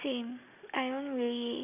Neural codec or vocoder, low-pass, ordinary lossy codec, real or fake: none; 3.6 kHz; none; real